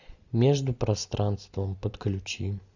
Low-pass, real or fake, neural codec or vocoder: 7.2 kHz; real; none